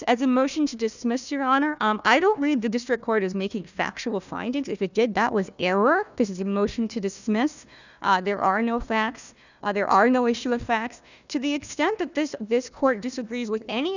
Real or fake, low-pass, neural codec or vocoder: fake; 7.2 kHz; codec, 16 kHz, 1 kbps, FunCodec, trained on Chinese and English, 50 frames a second